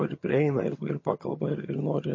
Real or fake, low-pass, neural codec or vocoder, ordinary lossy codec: fake; 7.2 kHz; vocoder, 22.05 kHz, 80 mel bands, HiFi-GAN; MP3, 32 kbps